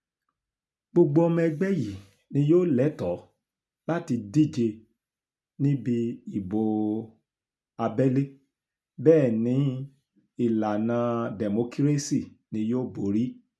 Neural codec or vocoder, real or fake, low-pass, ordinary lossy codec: none; real; none; none